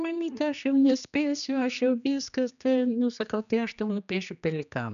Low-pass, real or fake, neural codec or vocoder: 7.2 kHz; fake; codec, 16 kHz, 2 kbps, X-Codec, HuBERT features, trained on general audio